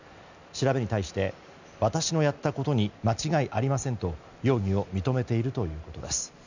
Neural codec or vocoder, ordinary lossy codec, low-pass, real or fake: none; none; 7.2 kHz; real